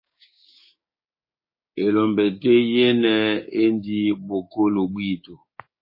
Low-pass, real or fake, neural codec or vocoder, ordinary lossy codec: 5.4 kHz; fake; codec, 16 kHz, 6 kbps, DAC; MP3, 24 kbps